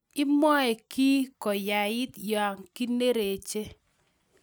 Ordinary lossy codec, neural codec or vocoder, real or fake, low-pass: none; none; real; none